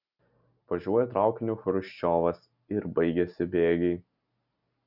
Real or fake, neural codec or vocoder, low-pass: real; none; 5.4 kHz